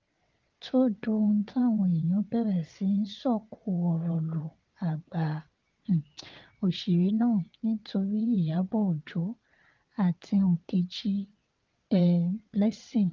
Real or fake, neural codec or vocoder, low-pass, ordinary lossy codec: fake; vocoder, 22.05 kHz, 80 mel bands, WaveNeXt; 7.2 kHz; Opus, 32 kbps